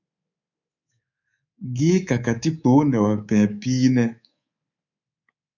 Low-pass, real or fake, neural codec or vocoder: 7.2 kHz; fake; codec, 24 kHz, 3.1 kbps, DualCodec